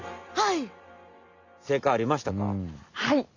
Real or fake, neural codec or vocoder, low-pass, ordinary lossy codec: real; none; 7.2 kHz; Opus, 64 kbps